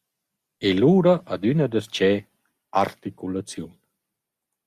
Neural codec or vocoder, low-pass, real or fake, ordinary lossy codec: none; 14.4 kHz; real; Opus, 64 kbps